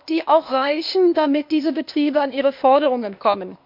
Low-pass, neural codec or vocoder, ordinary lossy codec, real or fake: 5.4 kHz; codec, 16 kHz, 0.8 kbps, ZipCodec; MP3, 48 kbps; fake